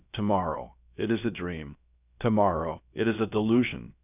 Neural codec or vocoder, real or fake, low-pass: codec, 16 kHz, about 1 kbps, DyCAST, with the encoder's durations; fake; 3.6 kHz